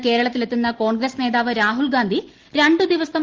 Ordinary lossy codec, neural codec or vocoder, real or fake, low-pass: Opus, 16 kbps; none; real; 7.2 kHz